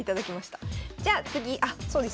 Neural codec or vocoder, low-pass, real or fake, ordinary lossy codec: none; none; real; none